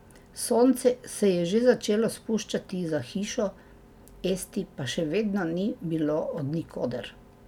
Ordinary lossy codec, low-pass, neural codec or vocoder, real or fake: none; 19.8 kHz; none; real